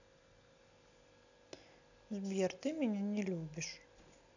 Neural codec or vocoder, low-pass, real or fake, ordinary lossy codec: none; 7.2 kHz; real; none